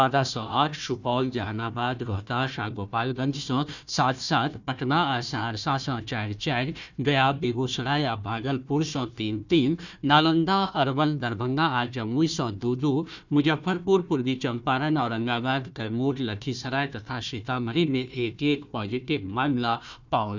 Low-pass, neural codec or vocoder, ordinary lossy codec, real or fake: 7.2 kHz; codec, 16 kHz, 1 kbps, FunCodec, trained on Chinese and English, 50 frames a second; none; fake